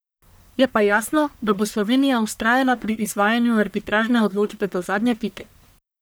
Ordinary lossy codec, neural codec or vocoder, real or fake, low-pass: none; codec, 44.1 kHz, 1.7 kbps, Pupu-Codec; fake; none